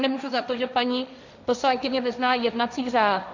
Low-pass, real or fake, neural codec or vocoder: 7.2 kHz; fake; codec, 16 kHz, 1.1 kbps, Voila-Tokenizer